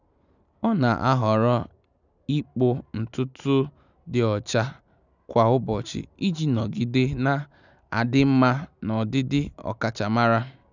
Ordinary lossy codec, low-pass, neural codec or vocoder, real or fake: none; 7.2 kHz; none; real